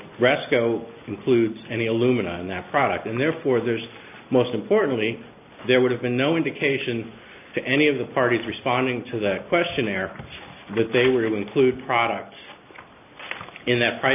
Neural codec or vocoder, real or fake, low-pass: none; real; 3.6 kHz